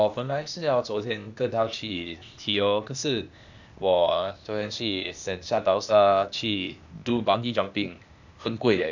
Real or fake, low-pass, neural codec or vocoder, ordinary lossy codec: fake; 7.2 kHz; codec, 16 kHz, 0.8 kbps, ZipCodec; none